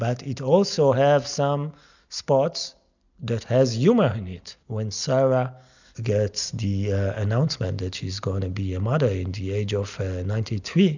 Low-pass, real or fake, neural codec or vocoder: 7.2 kHz; real; none